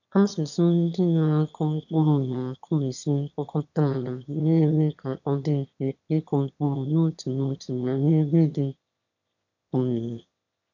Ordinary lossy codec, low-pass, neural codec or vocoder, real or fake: none; 7.2 kHz; autoencoder, 22.05 kHz, a latent of 192 numbers a frame, VITS, trained on one speaker; fake